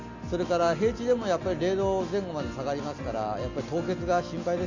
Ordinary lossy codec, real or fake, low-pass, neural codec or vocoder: none; real; 7.2 kHz; none